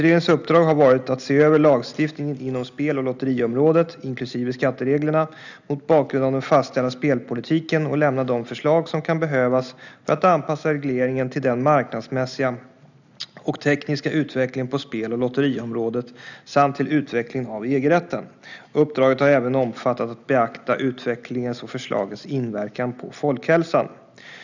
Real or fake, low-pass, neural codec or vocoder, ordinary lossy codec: real; 7.2 kHz; none; none